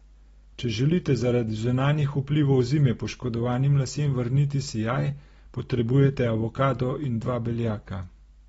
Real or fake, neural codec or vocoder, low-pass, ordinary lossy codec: real; none; 10.8 kHz; AAC, 24 kbps